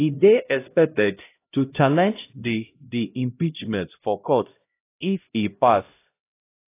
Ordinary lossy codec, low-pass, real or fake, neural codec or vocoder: AAC, 24 kbps; 3.6 kHz; fake; codec, 16 kHz, 0.5 kbps, X-Codec, HuBERT features, trained on LibriSpeech